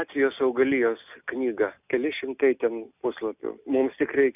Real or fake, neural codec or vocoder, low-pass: real; none; 3.6 kHz